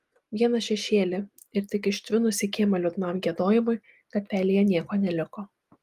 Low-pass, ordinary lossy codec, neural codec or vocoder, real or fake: 14.4 kHz; Opus, 32 kbps; none; real